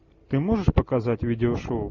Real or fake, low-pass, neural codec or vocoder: real; 7.2 kHz; none